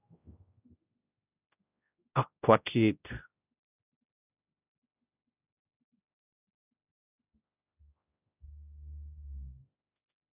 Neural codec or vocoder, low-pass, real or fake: codec, 16 kHz, 0.5 kbps, X-Codec, HuBERT features, trained on general audio; 3.6 kHz; fake